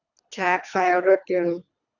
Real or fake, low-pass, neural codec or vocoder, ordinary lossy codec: fake; 7.2 kHz; codec, 24 kHz, 3 kbps, HILCodec; none